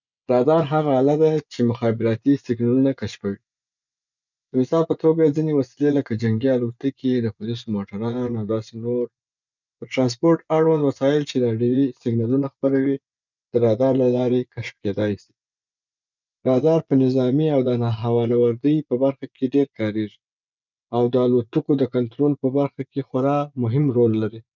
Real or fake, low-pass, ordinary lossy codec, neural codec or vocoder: fake; 7.2 kHz; none; vocoder, 24 kHz, 100 mel bands, Vocos